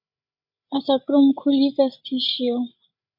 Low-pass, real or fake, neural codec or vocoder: 5.4 kHz; fake; codec, 16 kHz, 8 kbps, FreqCodec, larger model